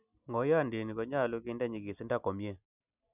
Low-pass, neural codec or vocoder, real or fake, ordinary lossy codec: 3.6 kHz; none; real; none